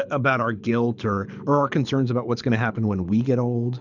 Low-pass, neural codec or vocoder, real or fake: 7.2 kHz; codec, 24 kHz, 6 kbps, HILCodec; fake